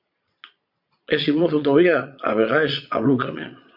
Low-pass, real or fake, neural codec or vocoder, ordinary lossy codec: 5.4 kHz; fake; vocoder, 22.05 kHz, 80 mel bands, Vocos; MP3, 48 kbps